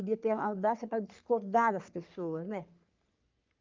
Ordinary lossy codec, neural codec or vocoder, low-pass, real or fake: Opus, 32 kbps; codec, 44.1 kHz, 3.4 kbps, Pupu-Codec; 7.2 kHz; fake